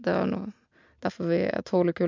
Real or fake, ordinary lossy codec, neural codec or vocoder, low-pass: real; MP3, 64 kbps; none; 7.2 kHz